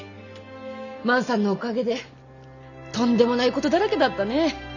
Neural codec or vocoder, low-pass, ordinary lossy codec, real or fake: none; 7.2 kHz; none; real